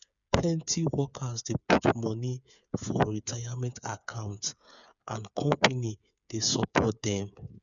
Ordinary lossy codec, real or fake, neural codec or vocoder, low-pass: none; fake; codec, 16 kHz, 8 kbps, FreqCodec, smaller model; 7.2 kHz